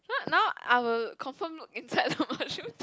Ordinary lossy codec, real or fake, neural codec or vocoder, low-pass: none; real; none; none